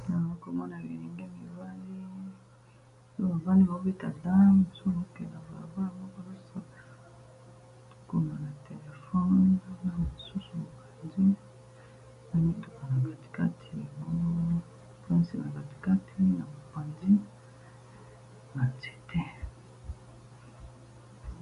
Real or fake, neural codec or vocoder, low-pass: real; none; 10.8 kHz